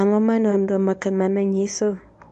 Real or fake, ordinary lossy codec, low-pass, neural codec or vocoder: fake; none; 10.8 kHz; codec, 24 kHz, 0.9 kbps, WavTokenizer, medium speech release version 1